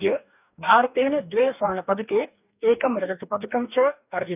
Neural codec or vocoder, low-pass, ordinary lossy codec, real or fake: codec, 44.1 kHz, 2.6 kbps, DAC; 3.6 kHz; none; fake